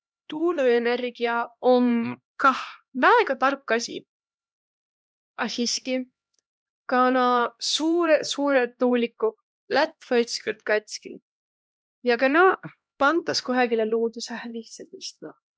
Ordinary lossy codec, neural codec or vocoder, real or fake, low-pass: none; codec, 16 kHz, 1 kbps, X-Codec, HuBERT features, trained on LibriSpeech; fake; none